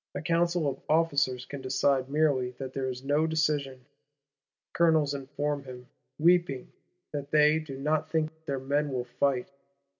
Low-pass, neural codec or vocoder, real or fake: 7.2 kHz; none; real